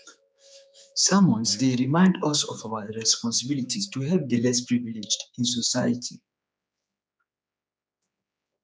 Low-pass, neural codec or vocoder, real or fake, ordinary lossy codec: none; codec, 16 kHz, 4 kbps, X-Codec, HuBERT features, trained on general audio; fake; none